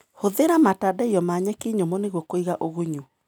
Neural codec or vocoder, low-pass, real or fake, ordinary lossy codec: none; none; real; none